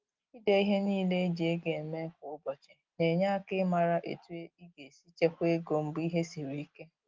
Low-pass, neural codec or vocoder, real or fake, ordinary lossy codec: 7.2 kHz; none; real; Opus, 24 kbps